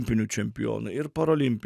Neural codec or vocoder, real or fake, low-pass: none; real; 14.4 kHz